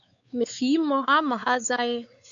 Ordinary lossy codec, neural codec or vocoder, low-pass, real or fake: MP3, 96 kbps; codec, 16 kHz, 4 kbps, X-Codec, WavLM features, trained on Multilingual LibriSpeech; 7.2 kHz; fake